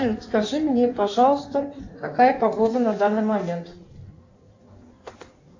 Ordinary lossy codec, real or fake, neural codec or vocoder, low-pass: AAC, 48 kbps; fake; codec, 16 kHz in and 24 kHz out, 1.1 kbps, FireRedTTS-2 codec; 7.2 kHz